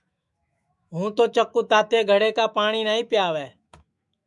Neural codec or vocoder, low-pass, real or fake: autoencoder, 48 kHz, 128 numbers a frame, DAC-VAE, trained on Japanese speech; 10.8 kHz; fake